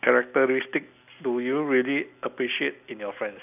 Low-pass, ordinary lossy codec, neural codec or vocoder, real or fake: 3.6 kHz; none; none; real